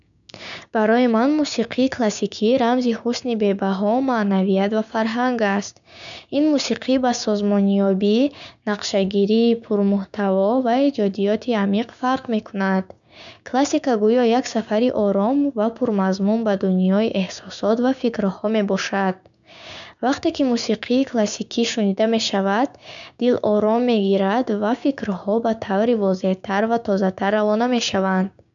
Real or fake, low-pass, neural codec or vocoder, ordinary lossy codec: fake; 7.2 kHz; codec, 16 kHz, 6 kbps, DAC; none